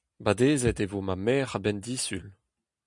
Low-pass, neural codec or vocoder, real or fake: 10.8 kHz; none; real